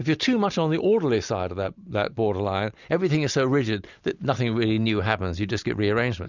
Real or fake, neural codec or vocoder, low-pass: real; none; 7.2 kHz